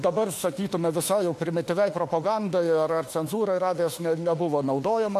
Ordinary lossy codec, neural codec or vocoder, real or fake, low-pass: AAC, 64 kbps; autoencoder, 48 kHz, 32 numbers a frame, DAC-VAE, trained on Japanese speech; fake; 14.4 kHz